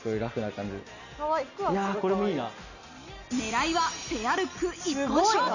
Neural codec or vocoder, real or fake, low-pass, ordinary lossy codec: none; real; 7.2 kHz; none